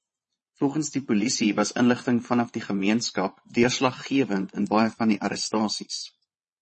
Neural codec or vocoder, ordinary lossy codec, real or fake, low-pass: vocoder, 22.05 kHz, 80 mel bands, Vocos; MP3, 32 kbps; fake; 9.9 kHz